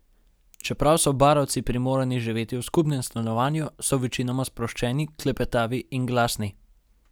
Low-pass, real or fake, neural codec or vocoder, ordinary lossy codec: none; real; none; none